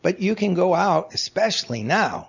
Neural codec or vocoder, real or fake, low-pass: none; real; 7.2 kHz